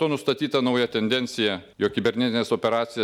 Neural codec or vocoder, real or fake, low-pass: none; real; 19.8 kHz